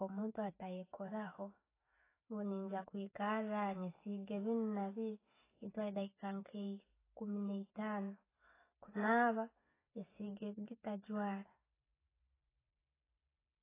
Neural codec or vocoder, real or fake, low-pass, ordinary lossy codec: none; real; 3.6 kHz; AAC, 16 kbps